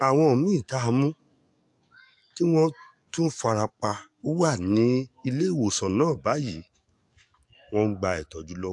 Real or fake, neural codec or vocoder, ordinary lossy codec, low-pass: fake; codec, 44.1 kHz, 7.8 kbps, DAC; AAC, 64 kbps; 10.8 kHz